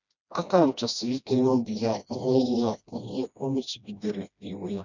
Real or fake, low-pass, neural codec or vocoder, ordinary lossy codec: fake; 7.2 kHz; codec, 16 kHz, 1 kbps, FreqCodec, smaller model; none